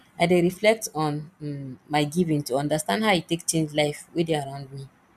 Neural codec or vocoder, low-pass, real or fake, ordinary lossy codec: none; 14.4 kHz; real; none